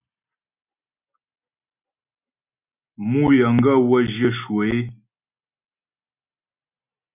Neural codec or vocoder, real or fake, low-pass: none; real; 3.6 kHz